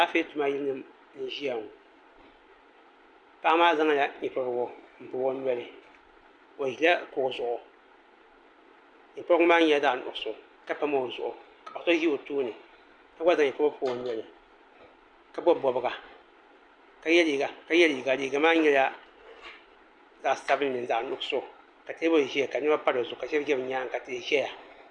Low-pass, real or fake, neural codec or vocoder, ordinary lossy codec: 9.9 kHz; real; none; Opus, 64 kbps